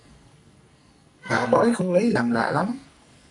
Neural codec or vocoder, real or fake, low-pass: codec, 44.1 kHz, 2.6 kbps, SNAC; fake; 10.8 kHz